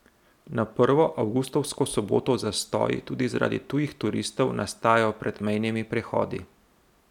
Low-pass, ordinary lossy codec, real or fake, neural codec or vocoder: 19.8 kHz; none; real; none